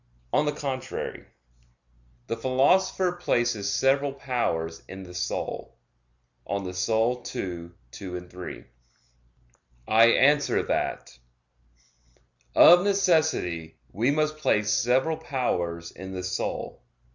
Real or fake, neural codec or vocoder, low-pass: real; none; 7.2 kHz